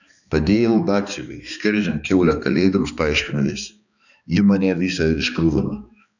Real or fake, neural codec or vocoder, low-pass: fake; codec, 16 kHz, 2 kbps, X-Codec, HuBERT features, trained on balanced general audio; 7.2 kHz